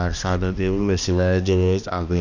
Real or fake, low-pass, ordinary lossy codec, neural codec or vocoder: fake; 7.2 kHz; none; codec, 16 kHz, 1 kbps, X-Codec, HuBERT features, trained on balanced general audio